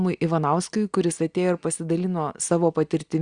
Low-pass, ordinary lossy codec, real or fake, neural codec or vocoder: 9.9 kHz; Opus, 64 kbps; real; none